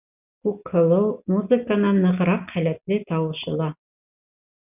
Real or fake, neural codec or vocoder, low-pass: real; none; 3.6 kHz